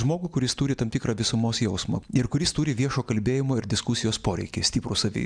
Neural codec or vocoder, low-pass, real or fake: none; 9.9 kHz; real